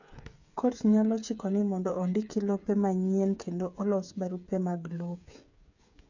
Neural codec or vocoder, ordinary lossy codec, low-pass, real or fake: codec, 16 kHz, 8 kbps, FreqCodec, smaller model; none; 7.2 kHz; fake